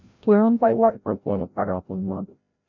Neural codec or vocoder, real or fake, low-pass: codec, 16 kHz, 0.5 kbps, FreqCodec, larger model; fake; 7.2 kHz